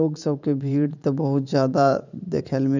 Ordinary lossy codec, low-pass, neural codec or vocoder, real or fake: none; 7.2 kHz; vocoder, 44.1 kHz, 80 mel bands, Vocos; fake